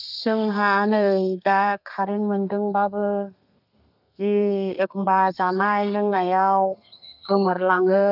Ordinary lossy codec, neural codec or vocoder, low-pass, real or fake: none; codec, 32 kHz, 1.9 kbps, SNAC; 5.4 kHz; fake